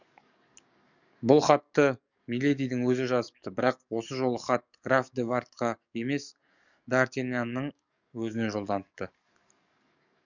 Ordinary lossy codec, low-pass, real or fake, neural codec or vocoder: none; 7.2 kHz; fake; codec, 44.1 kHz, 7.8 kbps, DAC